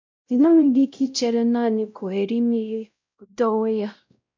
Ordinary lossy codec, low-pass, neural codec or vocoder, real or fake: MP3, 64 kbps; 7.2 kHz; codec, 16 kHz, 0.5 kbps, X-Codec, WavLM features, trained on Multilingual LibriSpeech; fake